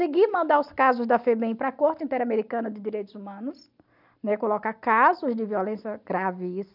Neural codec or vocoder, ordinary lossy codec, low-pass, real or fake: none; none; 5.4 kHz; real